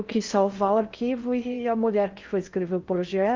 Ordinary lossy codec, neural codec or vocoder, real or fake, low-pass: Opus, 32 kbps; codec, 16 kHz in and 24 kHz out, 0.6 kbps, FocalCodec, streaming, 4096 codes; fake; 7.2 kHz